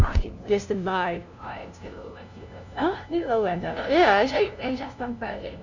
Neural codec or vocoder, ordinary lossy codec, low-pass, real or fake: codec, 16 kHz, 0.5 kbps, FunCodec, trained on LibriTTS, 25 frames a second; none; 7.2 kHz; fake